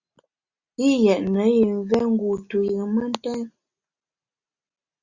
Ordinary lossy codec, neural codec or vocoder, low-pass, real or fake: Opus, 64 kbps; none; 7.2 kHz; real